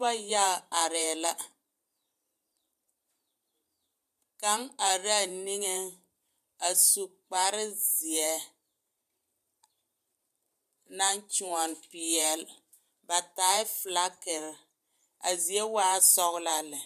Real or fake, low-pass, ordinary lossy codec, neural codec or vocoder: fake; 14.4 kHz; MP3, 96 kbps; vocoder, 44.1 kHz, 128 mel bands every 512 samples, BigVGAN v2